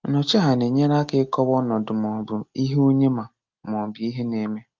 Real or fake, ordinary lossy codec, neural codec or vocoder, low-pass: real; Opus, 32 kbps; none; 7.2 kHz